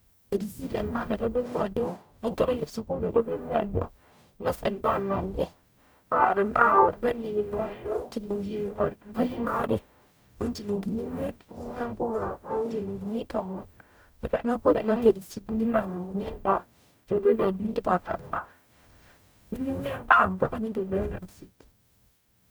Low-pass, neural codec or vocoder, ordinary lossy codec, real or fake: none; codec, 44.1 kHz, 0.9 kbps, DAC; none; fake